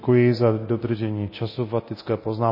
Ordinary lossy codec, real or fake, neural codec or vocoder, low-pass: MP3, 24 kbps; fake; codec, 24 kHz, 0.9 kbps, DualCodec; 5.4 kHz